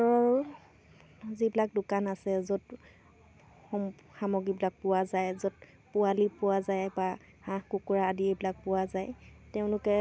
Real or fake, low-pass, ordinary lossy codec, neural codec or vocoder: real; none; none; none